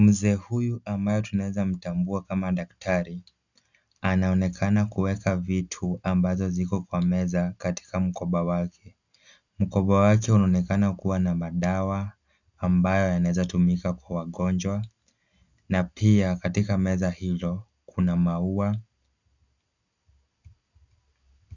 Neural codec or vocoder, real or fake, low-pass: none; real; 7.2 kHz